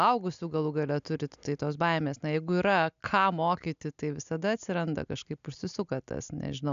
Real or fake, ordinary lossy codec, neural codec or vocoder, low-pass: real; AAC, 96 kbps; none; 7.2 kHz